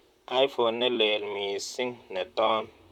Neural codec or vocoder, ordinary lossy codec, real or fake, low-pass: vocoder, 44.1 kHz, 128 mel bands, Pupu-Vocoder; none; fake; 19.8 kHz